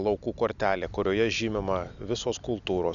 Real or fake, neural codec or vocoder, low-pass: real; none; 7.2 kHz